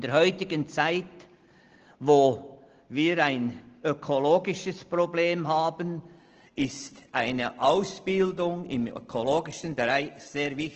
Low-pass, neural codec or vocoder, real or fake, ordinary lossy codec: 7.2 kHz; none; real; Opus, 16 kbps